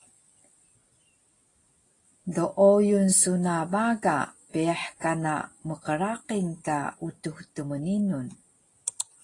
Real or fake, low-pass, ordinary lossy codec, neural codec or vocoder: real; 10.8 kHz; AAC, 32 kbps; none